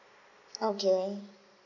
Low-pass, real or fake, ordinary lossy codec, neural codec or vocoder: 7.2 kHz; real; none; none